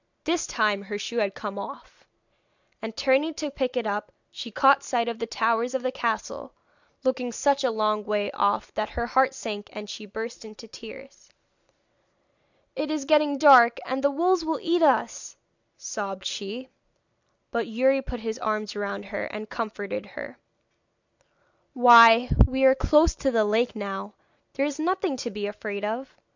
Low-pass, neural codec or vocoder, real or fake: 7.2 kHz; none; real